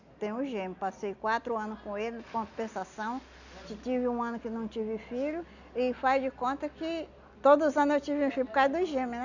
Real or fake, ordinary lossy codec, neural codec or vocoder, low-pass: real; none; none; 7.2 kHz